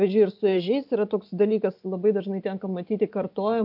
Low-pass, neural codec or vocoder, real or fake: 5.4 kHz; none; real